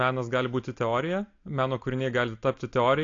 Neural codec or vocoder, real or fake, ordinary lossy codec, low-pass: none; real; AAC, 48 kbps; 7.2 kHz